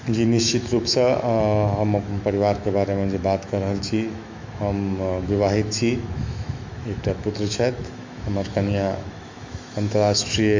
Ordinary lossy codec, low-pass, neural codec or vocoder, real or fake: MP3, 48 kbps; 7.2 kHz; autoencoder, 48 kHz, 128 numbers a frame, DAC-VAE, trained on Japanese speech; fake